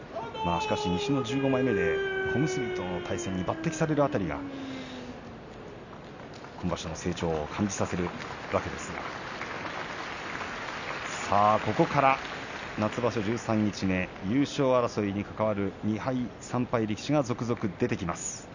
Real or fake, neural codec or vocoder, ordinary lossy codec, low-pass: real; none; none; 7.2 kHz